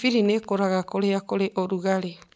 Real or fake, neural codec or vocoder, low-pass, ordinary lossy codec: real; none; none; none